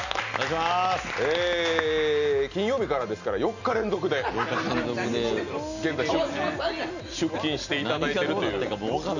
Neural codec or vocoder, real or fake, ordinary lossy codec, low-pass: none; real; none; 7.2 kHz